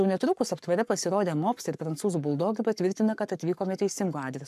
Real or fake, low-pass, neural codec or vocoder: fake; 14.4 kHz; codec, 44.1 kHz, 7.8 kbps, Pupu-Codec